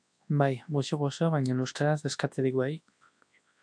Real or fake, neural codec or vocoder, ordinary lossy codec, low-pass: fake; codec, 24 kHz, 0.9 kbps, WavTokenizer, large speech release; AAC, 64 kbps; 9.9 kHz